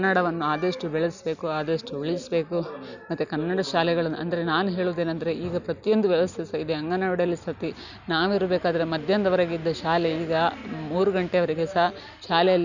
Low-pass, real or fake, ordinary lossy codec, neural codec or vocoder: 7.2 kHz; real; none; none